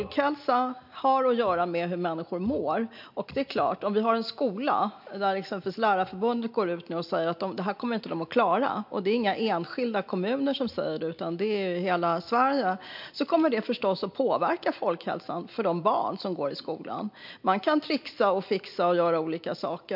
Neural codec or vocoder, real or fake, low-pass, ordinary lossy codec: none; real; 5.4 kHz; MP3, 48 kbps